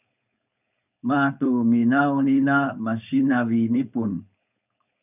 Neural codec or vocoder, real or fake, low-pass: codec, 16 kHz, 4.8 kbps, FACodec; fake; 3.6 kHz